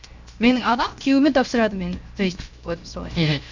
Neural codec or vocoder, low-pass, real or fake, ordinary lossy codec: codec, 16 kHz, 0.7 kbps, FocalCodec; 7.2 kHz; fake; MP3, 64 kbps